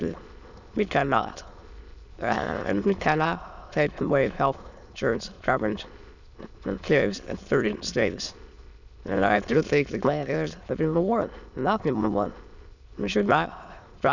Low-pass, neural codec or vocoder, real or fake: 7.2 kHz; autoencoder, 22.05 kHz, a latent of 192 numbers a frame, VITS, trained on many speakers; fake